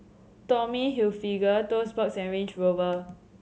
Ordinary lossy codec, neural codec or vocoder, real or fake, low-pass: none; none; real; none